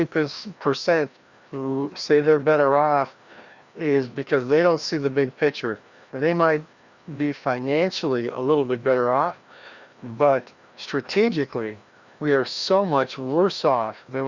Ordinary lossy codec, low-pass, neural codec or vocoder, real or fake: Opus, 64 kbps; 7.2 kHz; codec, 16 kHz, 1 kbps, FreqCodec, larger model; fake